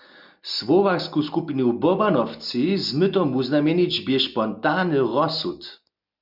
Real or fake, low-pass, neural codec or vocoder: real; 5.4 kHz; none